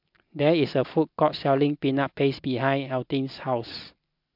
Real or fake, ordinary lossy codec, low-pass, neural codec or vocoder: real; MP3, 48 kbps; 5.4 kHz; none